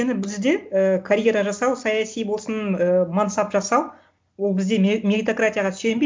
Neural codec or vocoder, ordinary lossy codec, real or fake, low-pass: none; none; real; none